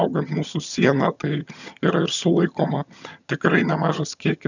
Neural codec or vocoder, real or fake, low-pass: vocoder, 22.05 kHz, 80 mel bands, HiFi-GAN; fake; 7.2 kHz